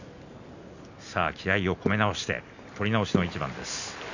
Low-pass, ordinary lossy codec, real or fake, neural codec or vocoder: 7.2 kHz; none; real; none